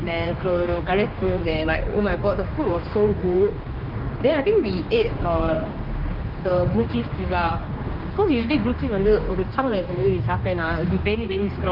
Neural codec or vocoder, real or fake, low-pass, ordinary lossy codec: codec, 16 kHz, 2 kbps, X-Codec, HuBERT features, trained on general audio; fake; 5.4 kHz; Opus, 24 kbps